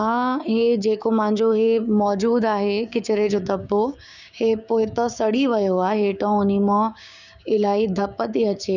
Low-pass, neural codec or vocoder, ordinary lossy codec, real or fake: 7.2 kHz; codec, 16 kHz, 6 kbps, DAC; none; fake